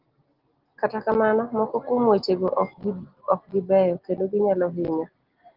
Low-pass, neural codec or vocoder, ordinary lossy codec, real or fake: 5.4 kHz; none; Opus, 16 kbps; real